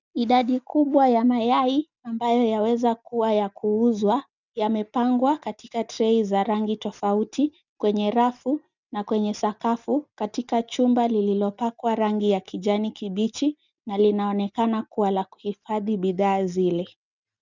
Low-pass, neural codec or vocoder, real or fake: 7.2 kHz; none; real